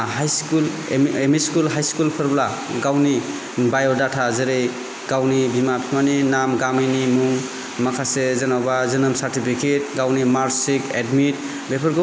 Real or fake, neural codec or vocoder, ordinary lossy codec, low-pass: real; none; none; none